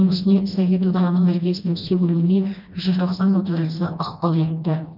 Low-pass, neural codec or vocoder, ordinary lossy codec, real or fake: 5.4 kHz; codec, 16 kHz, 1 kbps, FreqCodec, smaller model; none; fake